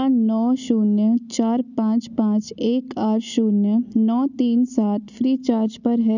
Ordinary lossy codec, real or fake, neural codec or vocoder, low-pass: none; real; none; 7.2 kHz